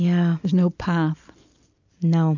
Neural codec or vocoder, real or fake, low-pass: none; real; 7.2 kHz